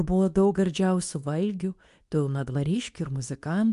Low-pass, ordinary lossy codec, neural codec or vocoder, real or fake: 10.8 kHz; MP3, 64 kbps; codec, 24 kHz, 0.9 kbps, WavTokenizer, medium speech release version 2; fake